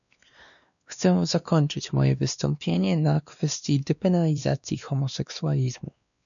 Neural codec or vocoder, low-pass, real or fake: codec, 16 kHz, 2 kbps, X-Codec, WavLM features, trained on Multilingual LibriSpeech; 7.2 kHz; fake